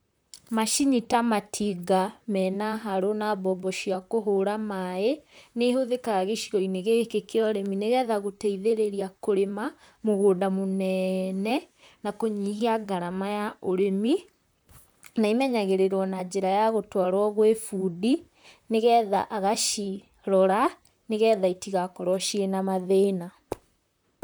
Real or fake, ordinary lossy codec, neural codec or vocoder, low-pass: fake; none; vocoder, 44.1 kHz, 128 mel bands, Pupu-Vocoder; none